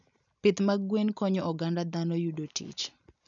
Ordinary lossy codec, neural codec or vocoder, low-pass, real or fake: none; none; 7.2 kHz; real